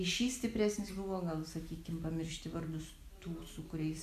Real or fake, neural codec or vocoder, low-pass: real; none; 14.4 kHz